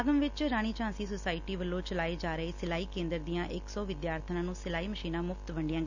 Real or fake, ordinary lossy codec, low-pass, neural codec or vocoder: real; none; 7.2 kHz; none